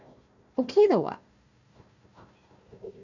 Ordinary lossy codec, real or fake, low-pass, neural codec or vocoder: none; fake; 7.2 kHz; codec, 16 kHz, 1 kbps, FunCodec, trained on Chinese and English, 50 frames a second